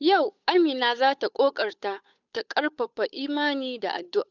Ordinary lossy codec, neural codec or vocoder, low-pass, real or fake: none; codec, 16 kHz, 8 kbps, FunCodec, trained on Chinese and English, 25 frames a second; 7.2 kHz; fake